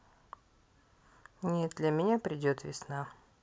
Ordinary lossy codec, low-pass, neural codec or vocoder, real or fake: none; none; none; real